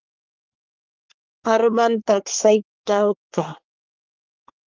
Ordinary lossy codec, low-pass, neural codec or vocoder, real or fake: Opus, 24 kbps; 7.2 kHz; codec, 24 kHz, 1 kbps, SNAC; fake